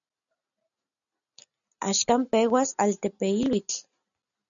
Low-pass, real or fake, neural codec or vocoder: 7.2 kHz; real; none